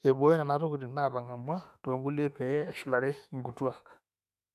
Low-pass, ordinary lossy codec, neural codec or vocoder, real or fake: 14.4 kHz; none; autoencoder, 48 kHz, 32 numbers a frame, DAC-VAE, trained on Japanese speech; fake